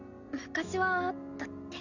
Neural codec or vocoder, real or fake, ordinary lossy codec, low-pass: none; real; none; 7.2 kHz